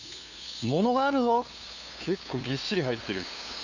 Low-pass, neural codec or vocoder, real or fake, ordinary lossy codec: 7.2 kHz; codec, 16 kHz, 2 kbps, FunCodec, trained on LibriTTS, 25 frames a second; fake; none